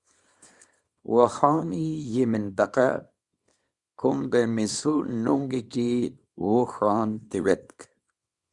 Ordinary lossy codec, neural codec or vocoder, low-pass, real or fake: Opus, 64 kbps; codec, 24 kHz, 0.9 kbps, WavTokenizer, small release; 10.8 kHz; fake